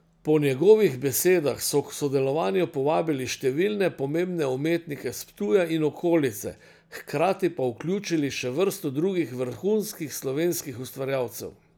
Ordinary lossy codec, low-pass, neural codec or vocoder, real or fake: none; none; none; real